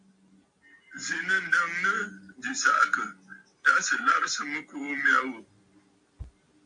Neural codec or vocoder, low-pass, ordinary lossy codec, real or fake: none; 9.9 kHz; AAC, 64 kbps; real